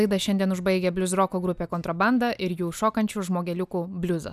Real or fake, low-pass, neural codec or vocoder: real; 14.4 kHz; none